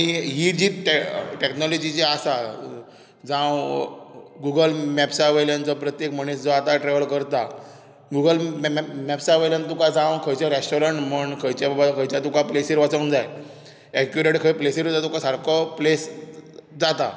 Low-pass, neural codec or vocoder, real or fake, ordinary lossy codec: none; none; real; none